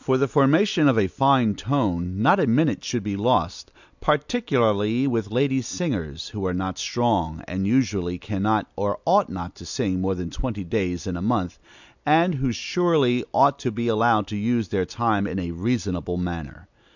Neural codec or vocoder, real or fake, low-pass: none; real; 7.2 kHz